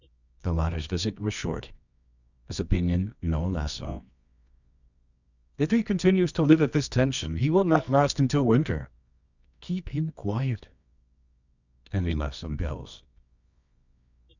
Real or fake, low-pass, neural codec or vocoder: fake; 7.2 kHz; codec, 24 kHz, 0.9 kbps, WavTokenizer, medium music audio release